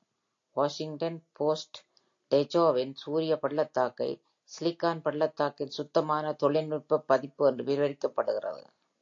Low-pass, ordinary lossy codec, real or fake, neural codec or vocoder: 7.2 kHz; AAC, 48 kbps; real; none